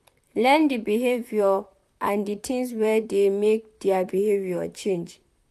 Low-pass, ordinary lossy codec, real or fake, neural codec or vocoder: 14.4 kHz; AAC, 96 kbps; fake; vocoder, 44.1 kHz, 128 mel bands, Pupu-Vocoder